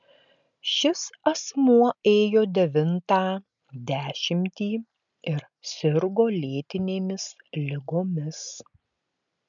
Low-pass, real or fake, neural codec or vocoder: 7.2 kHz; real; none